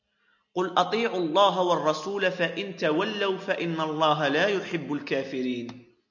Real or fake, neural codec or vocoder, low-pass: real; none; 7.2 kHz